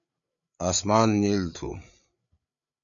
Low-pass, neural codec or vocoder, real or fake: 7.2 kHz; codec, 16 kHz, 8 kbps, FreqCodec, larger model; fake